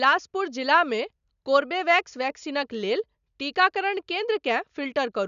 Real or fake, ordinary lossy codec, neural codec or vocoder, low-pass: real; none; none; 7.2 kHz